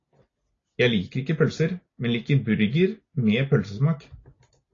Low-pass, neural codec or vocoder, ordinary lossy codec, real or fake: 7.2 kHz; none; AAC, 32 kbps; real